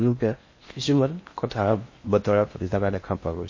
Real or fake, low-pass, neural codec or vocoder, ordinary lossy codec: fake; 7.2 kHz; codec, 16 kHz in and 24 kHz out, 0.6 kbps, FocalCodec, streaming, 4096 codes; MP3, 32 kbps